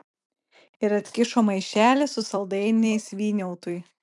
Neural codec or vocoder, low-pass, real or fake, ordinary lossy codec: vocoder, 44.1 kHz, 128 mel bands every 256 samples, BigVGAN v2; 10.8 kHz; fake; MP3, 96 kbps